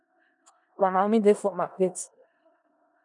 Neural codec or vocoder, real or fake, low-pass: codec, 16 kHz in and 24 kHz out, 0.4 kbps, LongCat-Audio-Codec, four codebook decoder; fake; 10.8 kHz